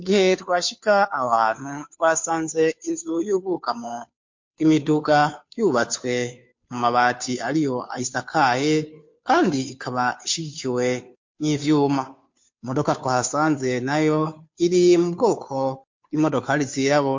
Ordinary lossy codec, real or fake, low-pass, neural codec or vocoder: MP3, 48 kbps; fake; 7.2 kHz; codec, 16 kHz, 2 kbps, FunCodec, trained on Chinese and English, 25 frames a second